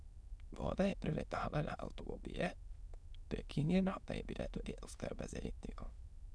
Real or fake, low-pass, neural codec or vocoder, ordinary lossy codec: fake; none; autoencoder, 22.05 kHz, a latent of 192 numbers a frame, VITS, trained on many speakers; none